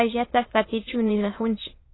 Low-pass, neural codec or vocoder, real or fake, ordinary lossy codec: 7.2 kHz; autoencoder, 22.05 kHz, a latent of 192 numbers a frame, VITS, trained on many speakers; fake; AAC, 16 kbps